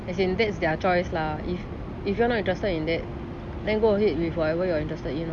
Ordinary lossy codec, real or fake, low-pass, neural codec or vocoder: none; real; none; none